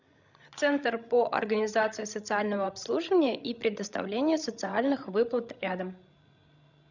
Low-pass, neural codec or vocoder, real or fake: 7.2 kHz; codec, 16 kHz, 16 kbps, FreqCodec, larger model; fake